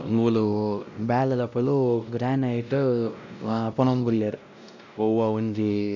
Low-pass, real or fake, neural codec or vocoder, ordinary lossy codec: 7.2 kHz; fake; codec, 16 kHz, 0.5 kbps, X-Codec, WavLM features, trained on Multilingual LibriSpeech; none